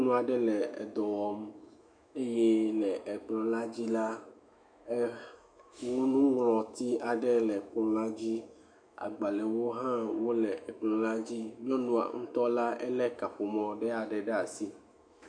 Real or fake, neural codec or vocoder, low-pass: fake; autoencoder, 48 kHz, 128 numbers a frame, DAC-VAE, trained on Japanese speech; 9.9 kHz